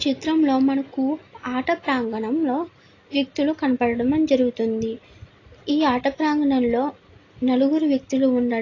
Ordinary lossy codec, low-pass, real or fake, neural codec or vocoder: AAC, 32 kbps; 7.2 kHz; real; none